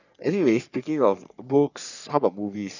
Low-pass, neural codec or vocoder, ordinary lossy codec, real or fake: 7.2 kHz; codec, 44.1 kHz, 3.4 kbps, Pupu-Codec; none; fake